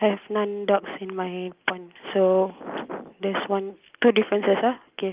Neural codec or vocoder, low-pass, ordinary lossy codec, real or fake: none; 3.6 kHz; Opus, 24 kbps; real